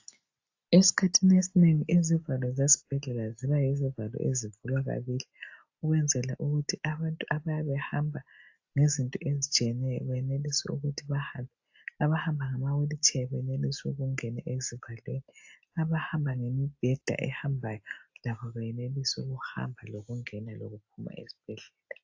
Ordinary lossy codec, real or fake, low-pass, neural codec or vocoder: AAC, 48 kbps; real; 7.2 kHz; none